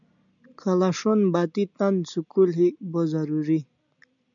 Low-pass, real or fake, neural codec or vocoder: 7.2 kHz; real; none